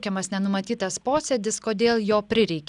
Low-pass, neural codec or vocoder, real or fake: 10.8 kHz; none; real